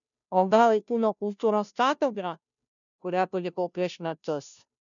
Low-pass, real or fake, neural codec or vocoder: 7.2 kHz; fake; codec, 16 kHz, 0.5 kbps, FunCodec, trained on Chinese and English, 25 frames a second